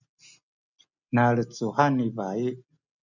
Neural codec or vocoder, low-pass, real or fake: none; 7.2 kHz; real